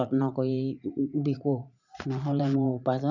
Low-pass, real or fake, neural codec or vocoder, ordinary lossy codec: 7.2 kHz; fake; vocoder, 44.1 kHz, 80 mel bands, Vocos; none